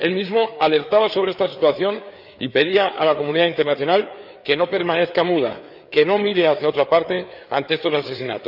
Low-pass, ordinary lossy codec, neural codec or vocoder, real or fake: 5.4 kHz; none; codec, 16 kHz, 4 kbps, FreqCodec, larger model; fake